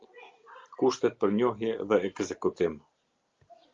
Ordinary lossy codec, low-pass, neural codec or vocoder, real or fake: Opus, 32 kbps; 7.2 kHz; none; real